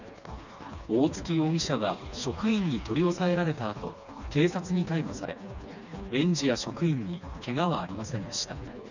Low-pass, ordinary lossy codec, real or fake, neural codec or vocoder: 7.2 kHz; none; fake; codec, 16 kHz, 2 kbps, FreqCodec, smaller model